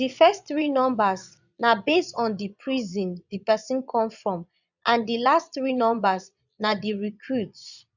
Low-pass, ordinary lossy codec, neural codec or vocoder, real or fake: 7.2 kHz; none; vocoder, 22.05 kHz, 80 mel bands, Vocos; fake